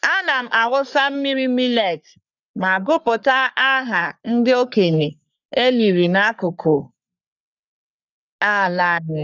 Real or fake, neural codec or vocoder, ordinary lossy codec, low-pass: fake; codec, 44.1 kHz, 3.4 kbps, Pupu-Codec; none; 7.2 kHz